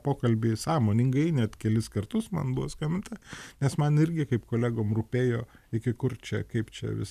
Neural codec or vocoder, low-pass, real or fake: none; 14.4 kHz; real